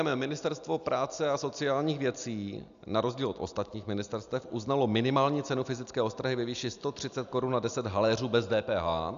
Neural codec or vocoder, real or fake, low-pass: none; real; 7.2 kHz